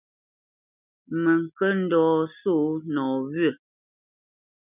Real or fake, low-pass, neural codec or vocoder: real; 3.6 kHz; none